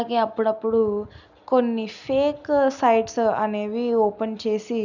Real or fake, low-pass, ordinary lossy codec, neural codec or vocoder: real; 7.2 kHz; none; none